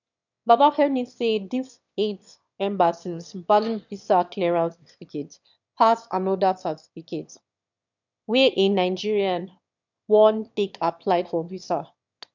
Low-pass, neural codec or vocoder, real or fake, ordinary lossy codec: 7.2 kHz; autoencoder, 22.05 kHz, a latent of 192 numbers a frame, VITS, trained on one speaker; fake; none